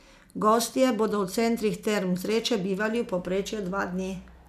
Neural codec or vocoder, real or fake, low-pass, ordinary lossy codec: none; real; 14.4 kHz; none